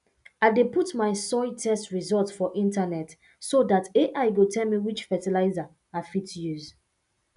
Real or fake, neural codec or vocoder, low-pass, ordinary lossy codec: real; none; 10.8 kHz; none